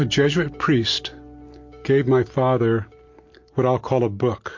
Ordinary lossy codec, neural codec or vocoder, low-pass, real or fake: MP3, 48 kbps; none; 7.2 kHz; real